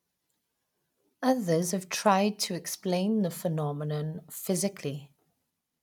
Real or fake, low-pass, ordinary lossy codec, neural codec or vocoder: real; 19.8 kHz; none; none